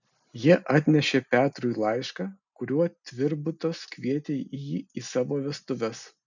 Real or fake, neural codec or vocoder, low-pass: real; none; 7.2 kHz